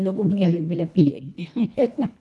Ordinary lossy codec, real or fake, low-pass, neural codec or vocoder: none; fake; none; codec, 24 kHz, 1.5 kbps, HILCodec